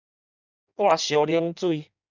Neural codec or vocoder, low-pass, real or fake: codec, 16 kHz in and 24 kHz out, 1.1 kbps, FireRedTTS-2 codec; 7.2 kHz; fake